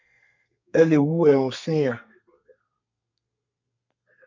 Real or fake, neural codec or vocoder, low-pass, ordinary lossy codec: fake; codec, 32 kHz, 1.9 kbps, SNAC; 7.2 kHz; MP3, 64 kbps